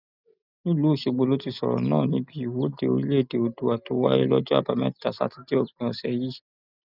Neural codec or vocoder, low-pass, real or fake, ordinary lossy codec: none; 5.4 kHz; real; none